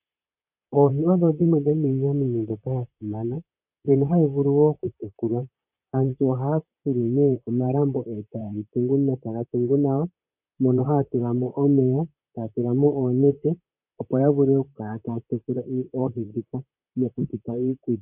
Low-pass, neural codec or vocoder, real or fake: 3.6 kHz; vocoder, 44.1 kHz, 128 mel bands, Pupu-Vocoder; fake